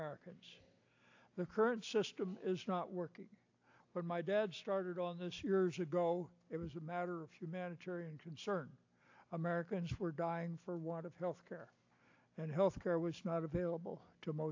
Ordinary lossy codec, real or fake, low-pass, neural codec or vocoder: AAC, 48 kbps; real; 7.2 kHz; none